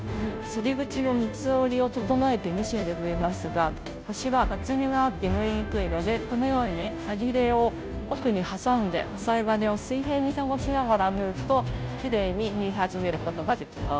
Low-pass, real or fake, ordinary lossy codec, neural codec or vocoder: none; fake; none; codec, 16 kHz, 0.5 kbps, FunCodec, trained on Chinese and English, 25 frames a second